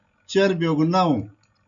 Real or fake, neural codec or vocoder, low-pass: real; none; 7.2 kHz